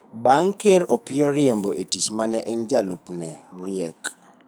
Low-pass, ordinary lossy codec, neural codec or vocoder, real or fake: none; none; codec, 44.1 kHz, 2.6 kbps, SNAC; fake